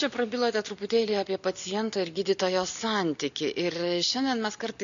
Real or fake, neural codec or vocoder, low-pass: real; none; 7.2 kHz